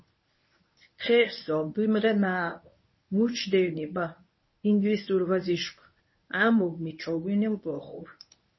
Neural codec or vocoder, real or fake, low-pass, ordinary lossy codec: codec, 24 kHz, 0.9 kbps, WavTokenizer, medium speech release version 1; fake; 7.2 kHz; MP3, 24 kbps